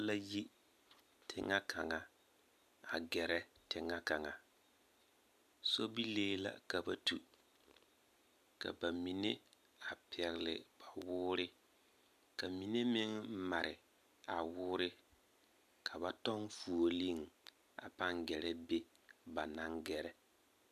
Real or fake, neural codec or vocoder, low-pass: real; none; 14.4 kHz